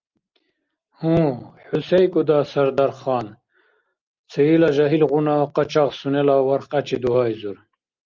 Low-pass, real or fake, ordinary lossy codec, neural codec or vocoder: 7.2 kHz; real; Opus, 24 kbps; none